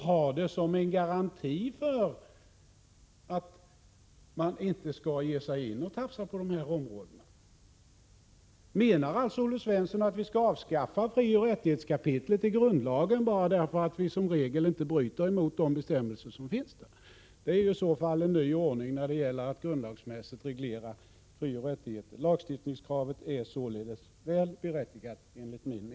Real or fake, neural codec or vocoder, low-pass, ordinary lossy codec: real; none; none; none